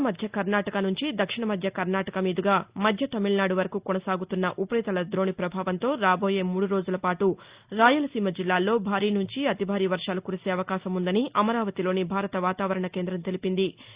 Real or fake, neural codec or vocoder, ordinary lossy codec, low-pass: real; none; Opus, 64 kbps; 3.6 kHz